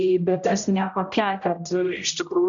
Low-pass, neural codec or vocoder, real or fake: 7.2 kHz; codec, 16 kHz, 0.5 kbps, X-Codec, HuBERT features, trained on general audio; fake